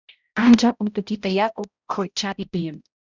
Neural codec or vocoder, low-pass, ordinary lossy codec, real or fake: codec, 16 kHz, 0.5 kbps, X-Codec, HuBERT features, trained on balanced general audio; 7.2 kHz; Opus, 64 kbps; fake